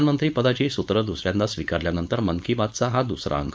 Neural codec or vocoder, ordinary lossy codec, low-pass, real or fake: codec, 16 kHz, 4.8 kbps, FACodec; none; none; fake